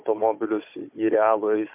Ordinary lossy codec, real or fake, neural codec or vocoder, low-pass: MP3, 32 kbps; fake; codec, 16 kHz, 16 kbps, FunCodec, trained on Chinese and English, 50 frames a second; 3.6 kHz